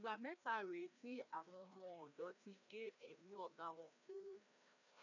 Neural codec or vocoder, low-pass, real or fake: codec, 16 kHz, 1 kbps, FreqCodec, larger model; 7.2 kHz; fake